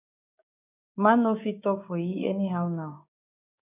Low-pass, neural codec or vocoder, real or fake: 3.6 kHz; codec, 16 kHz, 6 kbps, DAC; fake